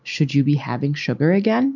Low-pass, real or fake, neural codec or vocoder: 7.2 kHz; real; none